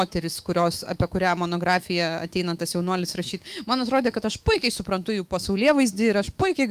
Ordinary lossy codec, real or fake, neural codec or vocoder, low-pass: Opus, 32 kbps; fake; autoencoder, 48 kHz, 128 numbers a frame, DAC-VAE, trained on Japanese speech; 14.4 kHz